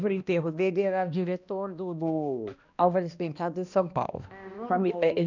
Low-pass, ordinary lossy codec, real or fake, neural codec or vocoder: 7.2 kHz; none; fake; codec, 16 kHz, 1 kbps, X-Codec, HuBERT features, trained on balanced general audio